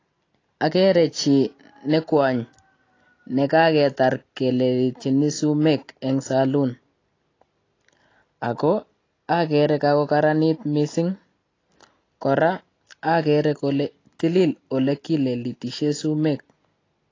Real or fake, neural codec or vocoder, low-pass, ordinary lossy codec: real; none; 7.2 kHz; AAC, 32 kbps